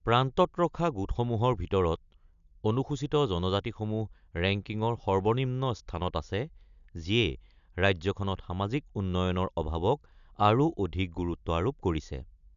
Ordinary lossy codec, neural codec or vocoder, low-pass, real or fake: none; none; 7.2 kHz; real